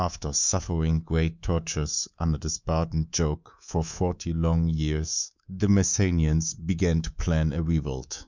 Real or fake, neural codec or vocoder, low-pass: fake; codec, 24 kHz, 3.1 kbps, DualCodec; 7.2 kHz